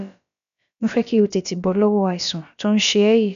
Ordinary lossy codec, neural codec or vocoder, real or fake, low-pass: none; codec, 16 kHz, about 1 kbps, DyCAST, with the encoder's durations; fake; 7.2 kHz